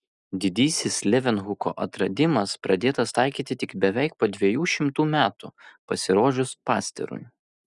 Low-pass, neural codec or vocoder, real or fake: 10.8 kHz; none; real